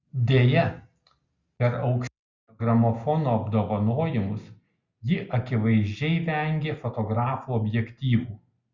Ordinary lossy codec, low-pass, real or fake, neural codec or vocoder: Opus, 64 kbps; 7.2 kHz; real; none